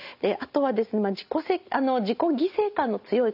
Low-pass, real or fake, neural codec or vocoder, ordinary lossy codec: 5.4 kHz; real; none; none